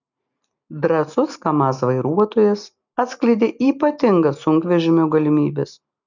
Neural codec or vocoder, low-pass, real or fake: none; 7.2 kHz; real